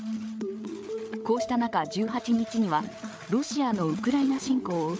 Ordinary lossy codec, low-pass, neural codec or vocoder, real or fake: none; none; codec, 16 kHz, 8 kbps, FreqCodec, larger model; fake